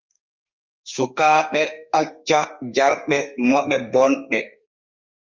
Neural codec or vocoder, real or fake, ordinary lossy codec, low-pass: codec, 32 kHz, 1.9 kbps, SNAC; fake; Opus, 32 kbps; 7.2 kHz